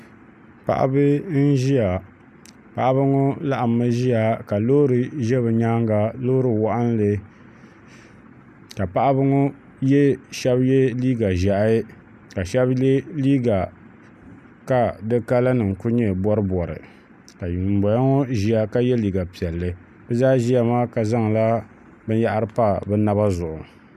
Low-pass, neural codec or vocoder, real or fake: 14.4 kHz; none; real